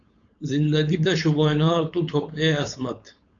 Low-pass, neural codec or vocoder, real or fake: 7.2 kHz; codec, 16 kHz, 4.8 kbps, FACodec; fake